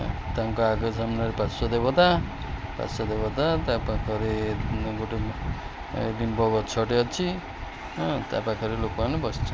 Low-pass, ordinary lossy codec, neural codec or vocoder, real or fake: none; none; none; real